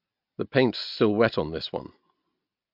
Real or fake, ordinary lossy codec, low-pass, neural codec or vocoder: real; MP3, 48 kbps; 5.4 kHz; none